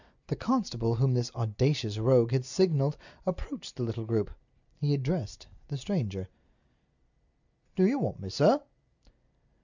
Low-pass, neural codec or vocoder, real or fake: 7.2 kHz; none; real